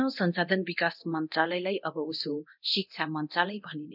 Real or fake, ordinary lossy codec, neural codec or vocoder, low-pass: fake; none; codec, 24 kHz, 0.9 kbps, DualCodec; 5.4 kHz